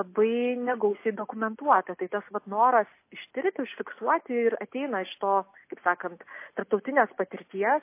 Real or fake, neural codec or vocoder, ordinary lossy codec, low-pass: real; none; MP3, 24 kbps; 3.6 kHz